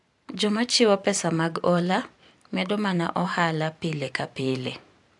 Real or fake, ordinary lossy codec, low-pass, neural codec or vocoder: fake; AAC, 64 kbps; 10.8 kHz; vocoder, 44.1 kHz, 128 mel bands every 512 samples, BigVGAN v2